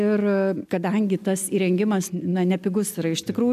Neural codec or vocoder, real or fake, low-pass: none; real; 14.4 kHz